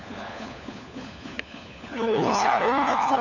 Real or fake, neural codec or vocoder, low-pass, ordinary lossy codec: fake; codec, 16 kHz, 4 kbps, FunCodec, trained on LibriTTS, 50 frames a second; 7.2 kHz; none